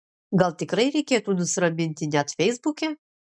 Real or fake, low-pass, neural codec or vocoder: real; 9.9 kHz; none